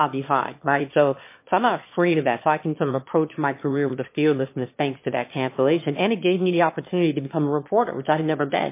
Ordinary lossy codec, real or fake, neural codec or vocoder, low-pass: MP3, 24 kbps; fake; autoencoder, 22.05 kHz, a latent of 192 numbers a frame, VITS, trained on one speaker; 3.6 kHz